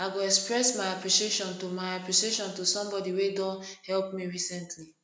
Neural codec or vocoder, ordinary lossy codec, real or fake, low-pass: none; none; real; none